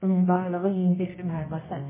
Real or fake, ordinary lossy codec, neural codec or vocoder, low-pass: fake; MP3, 16 kbps; codec, 24 kHz, 0.9 kbps, WavTokenizer, medium music audio release; 3.6 kHz